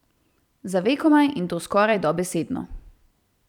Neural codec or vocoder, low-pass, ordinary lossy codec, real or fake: vocoder, 44.1 kHz, 128 mel bands every 256 samples, BigVGAN v2; 19.8 kHz; none; fake